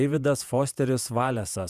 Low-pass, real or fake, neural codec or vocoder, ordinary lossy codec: 14.4 kHz; fake; vocoder, 48 kHz, 128 mel bands, Vocos; Opus, 64 kbps